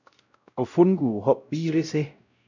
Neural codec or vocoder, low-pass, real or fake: codec, 16 kHz, 0.5 kbps, X-Codec, WavLM features, trained on Multilingual LibriSpeech; 7.2 kHz; fake